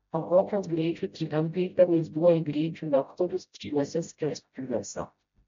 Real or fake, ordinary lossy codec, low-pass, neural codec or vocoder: fake; MP3, 48 kbps; 7.2 kHz; codec, 16 kHz, 0.5 kbps, FreqCodec, smaller model